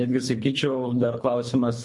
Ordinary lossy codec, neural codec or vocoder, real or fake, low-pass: MP3, 48 kbps; codec, 24 kHz, 3 kbps, HILCodec; fake; 10.8 kHz